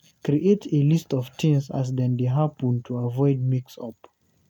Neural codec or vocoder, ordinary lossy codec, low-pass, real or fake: none; none; 19.8 kHz; real